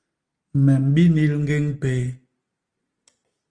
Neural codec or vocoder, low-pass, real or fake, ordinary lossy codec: none; 9.9 kHz; real; Opus, 24 kbps